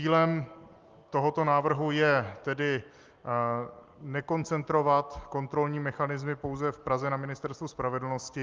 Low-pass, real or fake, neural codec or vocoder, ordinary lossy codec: 7.2 kHz; real; none; Opus, 32 kbps